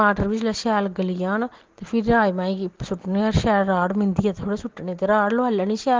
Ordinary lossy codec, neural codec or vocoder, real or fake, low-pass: Opus, 16 kbps; none; real; 7.2 kHz